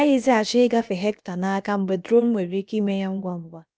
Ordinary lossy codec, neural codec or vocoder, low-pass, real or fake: none; codec, 16 kHz, about 1 kbps, DyCAST, with the encoder's durations; none; fake